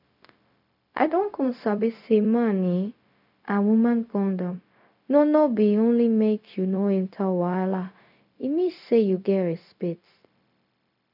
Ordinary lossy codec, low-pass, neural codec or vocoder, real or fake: none; 5.4 kHz; codec, 16 kHz, 0.4 kbps, LongCat-Audio-Codec; fake